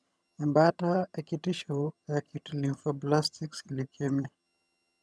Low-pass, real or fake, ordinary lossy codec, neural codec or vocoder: none; fake; none; vocoder, 22.05 kHz, 80 mel bands, HiFi-GAN